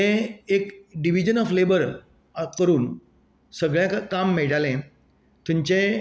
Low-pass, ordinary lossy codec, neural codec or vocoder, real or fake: none; none; none; real